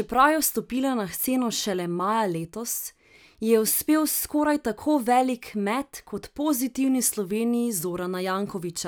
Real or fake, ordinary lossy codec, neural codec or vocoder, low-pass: real; none; none; none